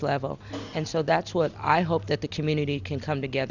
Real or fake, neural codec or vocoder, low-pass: real; none; 7.2 kHz